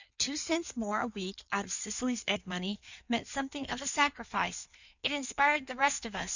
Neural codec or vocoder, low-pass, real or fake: codec, 16 kHz in and 24 kHz out, 1.1 kbps, FireRedTTS-2 codec; 7.2 kHz; fake